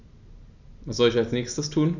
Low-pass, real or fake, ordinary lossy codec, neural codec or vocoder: 7.2 kHz; real; none; none